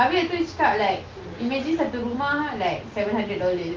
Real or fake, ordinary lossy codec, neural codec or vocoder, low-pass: real; Opus, 32 kbps; none; 7.2 kHz